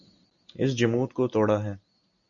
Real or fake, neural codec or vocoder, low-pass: real; none; 7.2 kHz